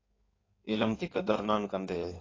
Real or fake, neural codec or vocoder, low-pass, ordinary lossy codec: fake; codec, 16 kHz in and 24 kHz out, 1.1 kbps, FireRedTTS-2 codec; 7.2 kHz; AAC, 32 kbps